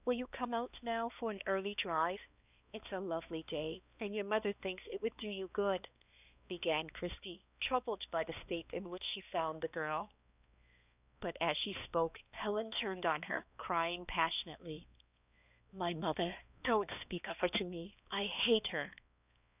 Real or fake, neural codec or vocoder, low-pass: fake; codec, 16 kHz, 2 kbps, X-Codec, HuBERT features, trained on balanced general audio; 3.6 kHz